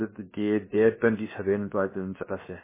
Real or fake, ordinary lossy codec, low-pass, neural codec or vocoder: fake; MP3, 16 kbps; 3.6 kHz; codec, 16 kHz, 0.7 kbps, FocalCodec